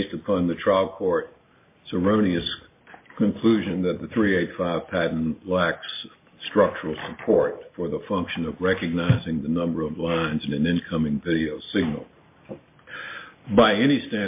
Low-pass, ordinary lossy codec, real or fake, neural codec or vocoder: 3.6 kHz; MP3, 24 kbps; real; none